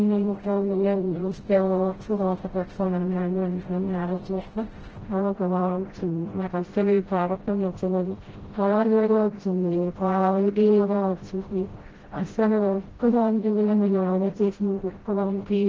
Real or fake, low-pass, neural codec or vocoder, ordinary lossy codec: fake; 7.2 kHz; codec, 16 kHz, 0.5 kbps, FreqCodec, smaller model; Opus, 16 kbps